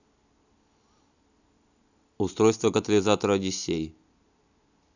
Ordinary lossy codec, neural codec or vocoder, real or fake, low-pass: none; none; real; 7.2 kHz